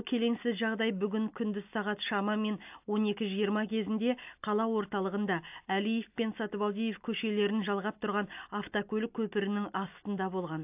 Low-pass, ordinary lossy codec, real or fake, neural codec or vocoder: 3.6 kHz; AAC, 32 kbps; real; none